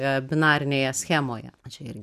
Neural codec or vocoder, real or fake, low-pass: none; real; 14.4 kHz